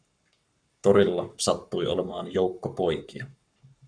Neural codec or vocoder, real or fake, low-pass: codec, 44.1 kHz, 7.8 kbps, Pupu-Codec; fake; 9.9 kHz